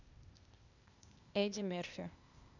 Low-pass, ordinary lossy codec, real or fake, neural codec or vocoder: 7.2 kHz; none; fake; codec, 16 kHz, 0.8 kbps, ZipCodec